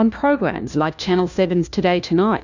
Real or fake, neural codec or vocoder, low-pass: fake; codec, 16 kHz, 1 kbps, FunCodec, trained on LibriTTS, 50 frames a second; 7.2 kHz